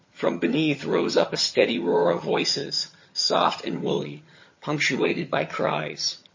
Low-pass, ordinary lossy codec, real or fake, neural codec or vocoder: 7.2 kHz; MP3, 32 kbps; fake; vocoder, 22.05 kHz, 80 mel bands, HiFi-GAN